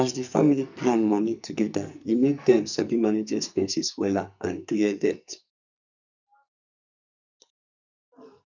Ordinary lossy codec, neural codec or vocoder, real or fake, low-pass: none; codec, 44.1 kHz, 2.6 kbps, SNAC; fake; 7.2 kHz